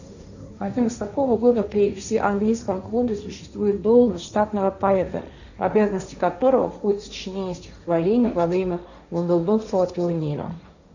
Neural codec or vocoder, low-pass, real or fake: codec, 16 kHz, 1.1 kbps, Voila-Tokenizer; 7.2 kHz; fake